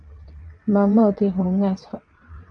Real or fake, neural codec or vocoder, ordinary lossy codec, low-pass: fake; vocoder, 22.05 kHz, 80 mel bands, WaveNeXt; MP3, 64 kbps; 9.9 kHz